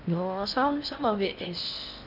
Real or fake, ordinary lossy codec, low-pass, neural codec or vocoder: fake; none; 5.4 kHz; codec, 16 kHz in and 24 kHz out, 0.8 kbps, FocalCodec, streaming, 65536 codes